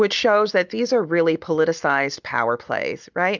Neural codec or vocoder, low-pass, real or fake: none; 7.2 kHz; real